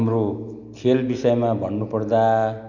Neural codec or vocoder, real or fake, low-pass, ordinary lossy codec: none; real; 7.2 kHz; none